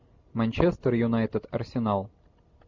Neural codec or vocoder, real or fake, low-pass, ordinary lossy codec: none; real; 7.2 kHz; MP3, 64 kbps